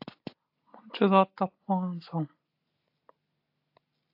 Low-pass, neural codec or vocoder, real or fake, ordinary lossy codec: 5.4 kHz; none; real; AAC, 48 kbps